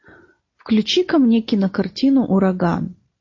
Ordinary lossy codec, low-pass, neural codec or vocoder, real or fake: MP3, 32 kbps; 7.2 kHz; none; real